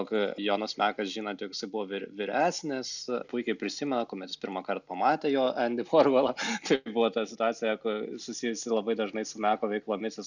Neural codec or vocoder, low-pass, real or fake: none; 7.2 kHz; real